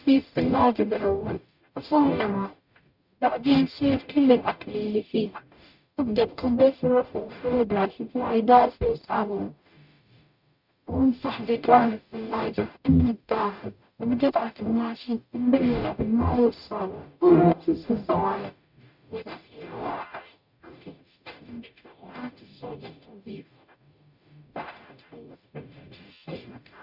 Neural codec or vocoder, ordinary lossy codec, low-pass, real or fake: codec, 44.1 kHz, 0.9 kbps, DAC; none; 5.4 kHz; fake